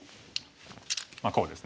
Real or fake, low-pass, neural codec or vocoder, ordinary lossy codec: real; none; none; none